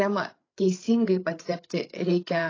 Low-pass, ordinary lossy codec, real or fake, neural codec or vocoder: 7.2 kHz; AAC, 32 kbps; fake; codec, 16 kHz, 8 kbps, FreqCodec, larger model